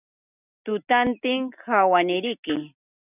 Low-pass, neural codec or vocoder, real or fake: 3.6 kHz; none; real